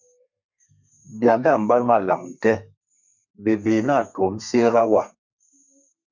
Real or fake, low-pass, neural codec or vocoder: fake; 7.2 kHz; codec, 32 kHz, 1.9 kbps, SNAC